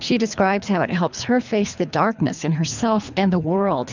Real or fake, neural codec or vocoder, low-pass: fake; codec, 24 kHz, 3 kbps, HILCodec; 7.2 kHz